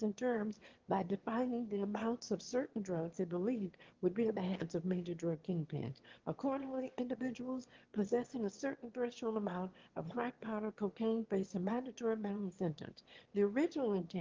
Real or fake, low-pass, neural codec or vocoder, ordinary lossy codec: fake; 7.2 kHz; autoencoder, 22.05 kHz, a latent of 192 numbers a frame, VITS, trained on one speaker; Opus, 16 kbps